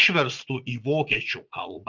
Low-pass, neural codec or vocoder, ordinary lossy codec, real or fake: 7.2 kHz; vocoder, 44.1 kHz, 80 mel bands, Vocos; Opus, 64 kbps; fake